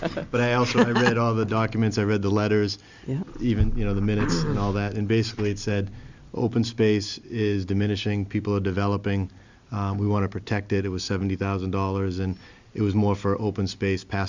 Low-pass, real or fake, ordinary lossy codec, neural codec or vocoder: 7.2 kHz; real; Opus, 64 kbps; none